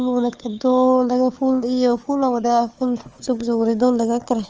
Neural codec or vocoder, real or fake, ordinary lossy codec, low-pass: codec, 16 kHz, 4 kbps, FunCodec, trained on Chinese and English, 50 frames a second; fake; Opus, 24 kbps; 7.2 kHz